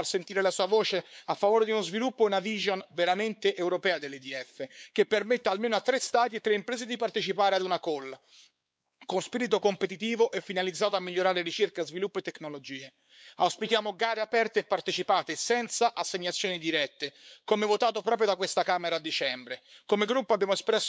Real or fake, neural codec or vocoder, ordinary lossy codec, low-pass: fake; codec, 16 kHz, 4 kbps, X-Codec, WavLM features, trained on Multilingual LibriSpeech; none; none